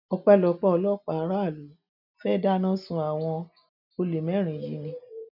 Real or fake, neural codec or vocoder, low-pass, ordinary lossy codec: real; none; 5.4 kHz; none